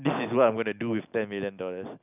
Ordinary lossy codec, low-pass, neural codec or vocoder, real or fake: none; 3.6 kHz; none; real